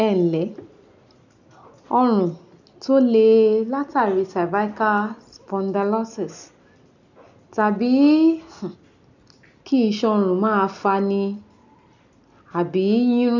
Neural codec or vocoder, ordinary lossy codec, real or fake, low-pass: none; none; real; 7.2 kHz